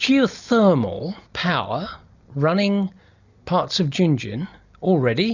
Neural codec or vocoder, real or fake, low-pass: none; real; 7.2 kHz